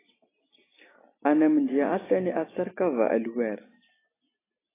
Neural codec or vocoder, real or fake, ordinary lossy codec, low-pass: none; real; AAC, 16 kbps; 3.6 kHz